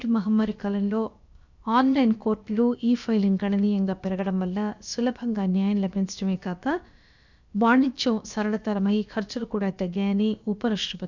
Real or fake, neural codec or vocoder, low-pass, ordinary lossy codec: fake; codec, 16 kHz, about 1 kbps, DyCAST, with the encoder's durations; 7.2 kHz; none